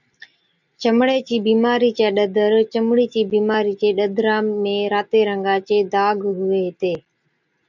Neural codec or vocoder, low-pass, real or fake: none; 7.2 kHz; real